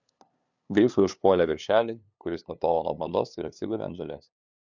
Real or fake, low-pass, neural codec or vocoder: fake; 7.2 kHz; codec, 16 kHz, 2 kbps, FunCodec, trained on LibriTTS, 25 frames a second